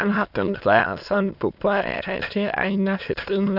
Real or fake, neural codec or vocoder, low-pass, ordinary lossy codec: fake; autoencoder, 22.05 kHz, a latent of 192 numbers a frame, VITS, trained on many speakers; 5.4 kHz; none